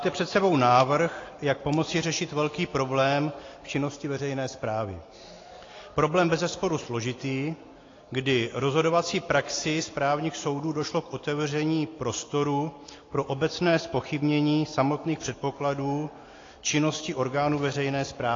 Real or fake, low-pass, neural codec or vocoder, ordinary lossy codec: real; 7.2 kHz; none; AAC, 32 kbps